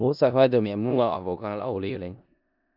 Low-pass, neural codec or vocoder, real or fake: 5.4 kHz; codec, 16 kHz in and 24 kHz out, 0.4 kbps, LongCat-Audio-Codec, four codebook decoder; fake